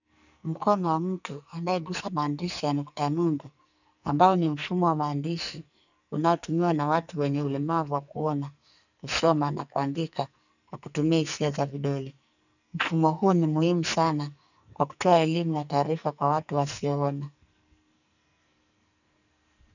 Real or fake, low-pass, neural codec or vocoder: fake; 7.2 kHz; codec, 44.1 kHz, 2.6 kbps, SNAC